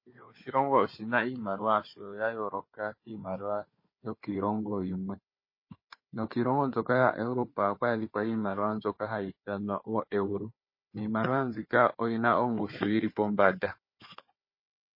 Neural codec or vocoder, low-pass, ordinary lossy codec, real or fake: codec, 16 kHz, 4 kbps, FunCodec, trained on Chinese and English, 50 frames a second; 5.4 kHz; MP3, 24 kbps; fake